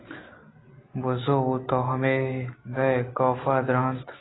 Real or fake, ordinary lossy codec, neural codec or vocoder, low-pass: real; AAC, 16 kbps; none; 7.2 kHz